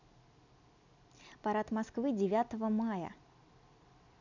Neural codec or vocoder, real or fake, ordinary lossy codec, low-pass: none; real; none; 7.2 kHz